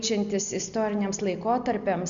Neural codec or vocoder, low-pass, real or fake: none; 7.2 kHz; real